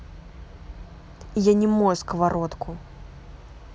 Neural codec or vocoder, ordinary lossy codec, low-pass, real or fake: none; none; none; real